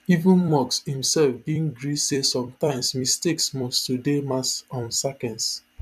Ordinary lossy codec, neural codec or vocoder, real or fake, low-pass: none; vocoder, 44.1 kHz, 128 mel bands every 256 samples, BigVGAN v2; fake; 14.4 kHz